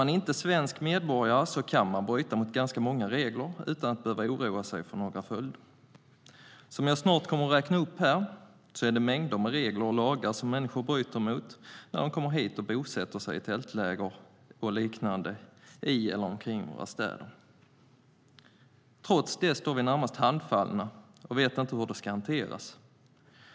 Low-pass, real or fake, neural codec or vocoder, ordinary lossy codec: none; real; none; none